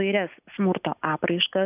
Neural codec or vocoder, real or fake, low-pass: none; real; 3.6 kHz